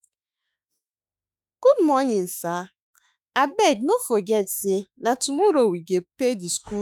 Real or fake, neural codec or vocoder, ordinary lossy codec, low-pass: fake; autoencoder, 48 kHz, 32 numbers a frame, DAC-VAE, trained on Japanese speech; none; none